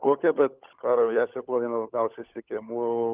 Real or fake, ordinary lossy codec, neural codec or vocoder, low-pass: fake; Opus, 32 kbps; codec, 16 kHz, 16 kbps, FunCodec, trained on LibriTTS, 50 frames a second; 3.6 kHz